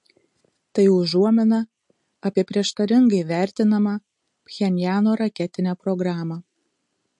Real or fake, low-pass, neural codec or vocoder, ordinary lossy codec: real; 10.8 kHz; none; MP3, 48 kbps